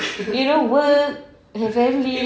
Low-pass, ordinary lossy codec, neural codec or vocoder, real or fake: none; none; none; real